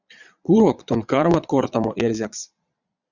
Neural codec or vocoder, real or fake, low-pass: vocoder, 22.05 kHz, 80 mel bands, Vocos; fake; 7.2 kHz